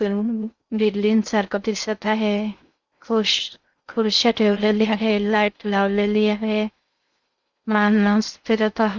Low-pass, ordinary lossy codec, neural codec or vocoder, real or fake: 7.2 kHz; Opus, 64 kbps; codec, 16 kHz in and 24 kHz out, 0.6 kbps, FocalCodec, streaming, 2048 codes; fake